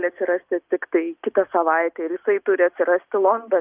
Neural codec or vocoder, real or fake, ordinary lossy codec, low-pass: none; real; Opus, 24 kbps; 3.6 kHz